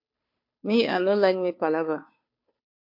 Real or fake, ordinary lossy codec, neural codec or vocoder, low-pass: fake; MP3, 32 kbps; codec, 16 kHz, 2 kbps, FunCodec, trained on Chinese and English, 25 frames a second; 5.4 kHz